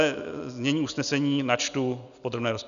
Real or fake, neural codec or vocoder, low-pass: real; none; 7.2 kHz